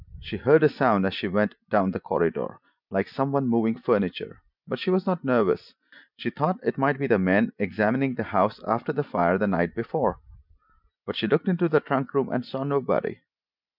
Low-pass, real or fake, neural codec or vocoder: 5.4 kHz; real; none